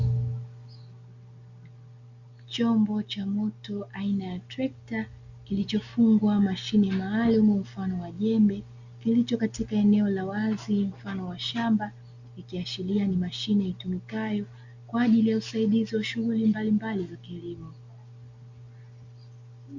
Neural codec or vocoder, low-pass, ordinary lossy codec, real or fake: none; 7.2 kHz; Opus, 64 kbps; real